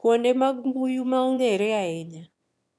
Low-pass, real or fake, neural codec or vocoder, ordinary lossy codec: none; fake; autoencoder, 22.05 kHz, a latent of 192 numbers a frame, VITS, trained on one speaker; none